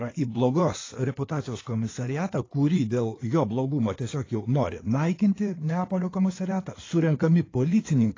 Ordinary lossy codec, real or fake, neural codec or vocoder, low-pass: AAC, 32 kbps; fake; codec, 16 kHz in and 24 kHz out, 2.2 kbps, FireRedTTS-2 codec; 7.2 kHz